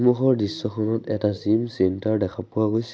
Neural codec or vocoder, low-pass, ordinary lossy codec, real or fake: none; none; none; real